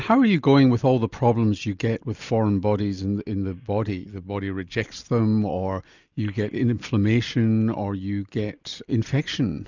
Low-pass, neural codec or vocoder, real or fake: 7.2 kHz; none; real